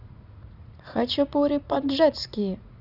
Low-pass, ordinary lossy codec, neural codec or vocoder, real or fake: 5.4 kHz; none; vocoder, 44.1 kHz, 80 mel bands, Vocos; fake